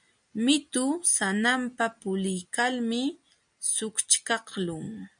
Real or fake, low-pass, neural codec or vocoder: real; 9.9 kHz; none